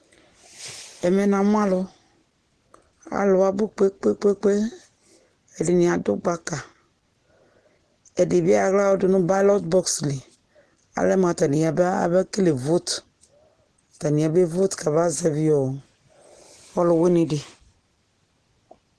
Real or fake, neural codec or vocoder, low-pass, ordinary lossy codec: real; none; 10.8 kHz; Opus, 16 kbps